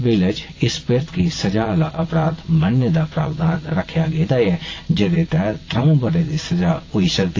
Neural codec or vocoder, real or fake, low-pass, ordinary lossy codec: vocoder, 22.05 kHz, 80 mel bands, WaveNeXt; fake; 7.2 kHz; AAC, 32 kbps